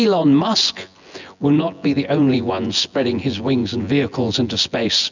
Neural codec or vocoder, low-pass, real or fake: vocoder, 24 kHz, 100 mel bands, Vocos; 7.2 kHz; fake